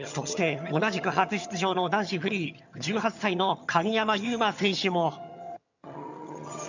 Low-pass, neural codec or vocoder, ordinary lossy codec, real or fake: 7.2 kHz; vocoder, 22.05 kHz, 80 mel bands, HiFi-GAN; none; fake